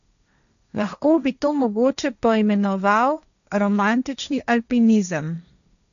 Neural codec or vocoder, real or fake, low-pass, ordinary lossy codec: codec, 16 kHz, 1.1 kbps, Voila-Tokenizer; fake; 7.2 kHz; none